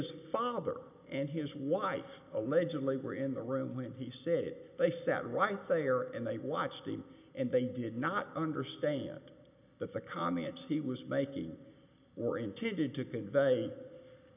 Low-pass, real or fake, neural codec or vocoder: 3.6 kHz; real; none